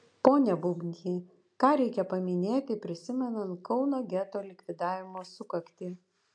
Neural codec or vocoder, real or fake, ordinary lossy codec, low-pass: none; real; AAC, 64 kbps; 9.9 kHz